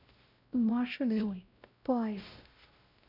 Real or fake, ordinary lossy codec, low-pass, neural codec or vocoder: fake; none; 5.4 kHz; codec, 16 kHz, 0.5 kbps, X-Codec, WavLM features, trained on Multilingual LibriSpeech